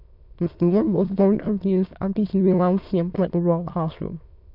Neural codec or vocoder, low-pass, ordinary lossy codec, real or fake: autoencoder, 22.05 kHz, a latent of 192 numbers a frame, VITS, trained on many speakers; 5.4 kHz; none; fake